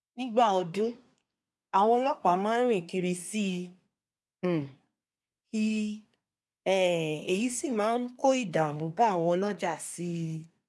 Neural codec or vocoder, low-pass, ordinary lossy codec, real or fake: codec, 24 kHz, 1 kbps, SNAC; none; none; fake